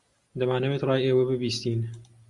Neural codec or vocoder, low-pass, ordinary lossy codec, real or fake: none; 10.8 kHz; Opus, 64 kbps; real